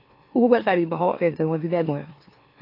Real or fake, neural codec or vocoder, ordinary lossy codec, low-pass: fake; autoencoder, 44.1 kHz, a latent of 192 numbers a frame, MeloTTS; AAC, 32 kbps; 5.4 kHz